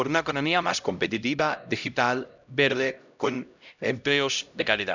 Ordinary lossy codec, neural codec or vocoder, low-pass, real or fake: none; codec, 16 kHz, 0.5 kbps, X-Codec, HuBERT features, trained on LibriSpeech; 7.2 kHz; fake